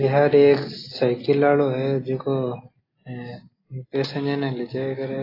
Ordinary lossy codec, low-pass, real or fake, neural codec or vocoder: AAC, 24 kbps; 5.4 kHz; real; none